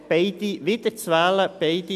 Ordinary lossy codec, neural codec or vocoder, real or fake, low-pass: none; none; real; 14.4 kHz